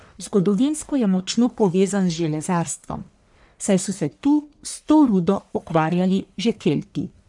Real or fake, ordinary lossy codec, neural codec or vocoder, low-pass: fake; none; codec, 44.1 kHz, 1.7 kbps, Pupu-Codec; 10.8 kHz